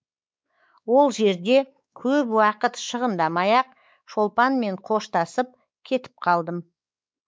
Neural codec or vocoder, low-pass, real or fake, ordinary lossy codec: codec, 16 kHz, 4 kbps, X-Codec, WavLM features, trained on Multilingual LibriSpeech; none; fake; none